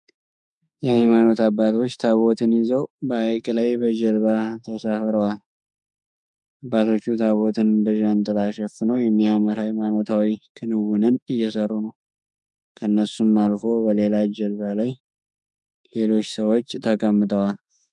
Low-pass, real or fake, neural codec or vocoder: 10.8 kHz; fake; autoencoder, 48 kHz, 32 numbers a frame, DAC-VAE, trained on Japanese speech